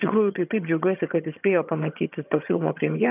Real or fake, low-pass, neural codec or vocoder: fake; 3.6 kHz; vocoder, 22.05 kHz, 80 mel bands, HiFi-GAN